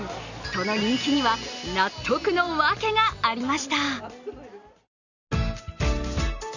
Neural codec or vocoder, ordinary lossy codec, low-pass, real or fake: none; none; 7.2 kHz; real